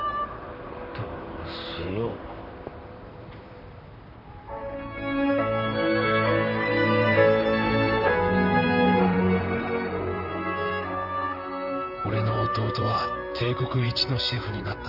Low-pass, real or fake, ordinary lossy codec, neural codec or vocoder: 5.4 kHz; fake; none; vocoder, 44.1 kHz, 128 mel bands, Pupu-Vocoder